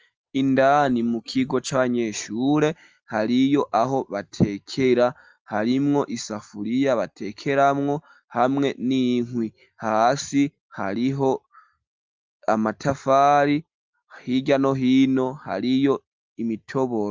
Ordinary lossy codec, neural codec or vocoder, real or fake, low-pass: Opus, 24 kbps; none; real; 7.2 kHz